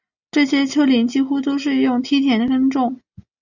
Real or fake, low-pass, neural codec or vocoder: real; 7.2 kHz; none